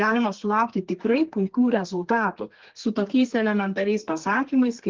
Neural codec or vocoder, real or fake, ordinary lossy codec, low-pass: codec, 32 kHz, 1.9 kbps, SNAC; fake; Opus, 16 kbps; 7.2 kHz